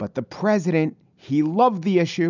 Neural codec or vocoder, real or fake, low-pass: none; real; 7.2 kHz